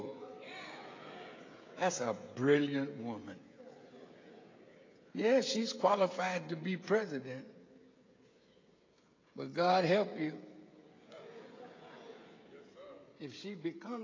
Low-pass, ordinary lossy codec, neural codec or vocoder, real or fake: 7.2 kHz; AAC, 32 kbps; codec, 16 kHz, 16 kbps, FreqCodec, smaller model; fake